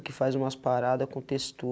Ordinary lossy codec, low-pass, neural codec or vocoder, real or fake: none; none; none; real